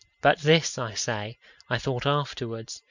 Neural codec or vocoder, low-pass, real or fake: none; 7.2 kHz; real